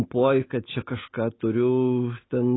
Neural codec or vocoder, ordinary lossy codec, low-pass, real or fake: none; AAC, 16 kbps; 7.2 kHz; real